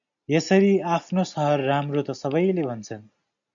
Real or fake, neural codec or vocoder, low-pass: real; none; 7.2 kHz